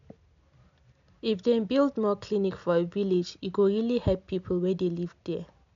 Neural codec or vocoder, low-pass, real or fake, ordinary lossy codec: none; 7.2 kHz; real; MP3, 64 kbps